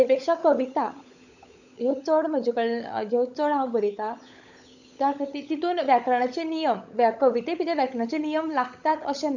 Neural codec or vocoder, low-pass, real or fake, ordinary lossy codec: codec, 16 kHz, 16 kbps, FunCodec, trained on LibriTTS, 50 frames a second; 7.2 kHz; fake; none